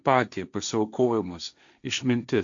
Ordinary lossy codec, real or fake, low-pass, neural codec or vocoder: MP3, 64 kbps; fake; 7.2 kHz; codec, 16 kHz, 1.1 kbps, Voila-Tokenizer